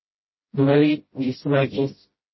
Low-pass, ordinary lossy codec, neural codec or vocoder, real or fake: 7.2 kHz; MP3, 24 kbps; codec, 16 kHz, 0.5 kbps, FreqCodec, smaller model; fake